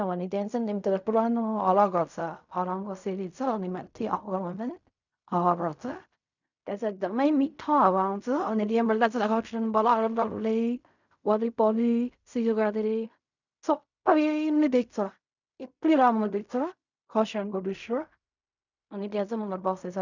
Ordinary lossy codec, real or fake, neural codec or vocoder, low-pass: none; fake; codec, 16 kHz in and 24 kHz out, 0.4 kbps, LongCat-Audio-Codec, fine tuned four codebook decoder; 7.2 kHz